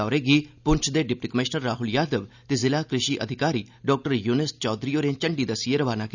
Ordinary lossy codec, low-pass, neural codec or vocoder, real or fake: none; 7.2 kHz; none; real